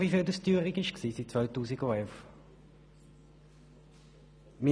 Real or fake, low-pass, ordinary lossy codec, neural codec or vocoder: real; none; none; none